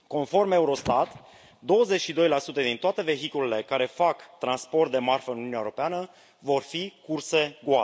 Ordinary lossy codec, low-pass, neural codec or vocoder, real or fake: none; none; none; real